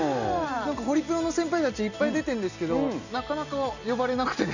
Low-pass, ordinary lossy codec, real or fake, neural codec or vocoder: 7.2 kHz; none; real; none